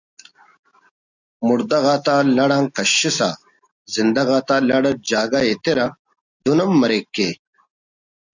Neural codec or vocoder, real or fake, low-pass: none; real; 7.2 kHz